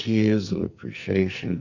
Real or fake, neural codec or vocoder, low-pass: fake; codec, 44.1 kHz, 2.6 kbps, SNAC; 7.2 kHz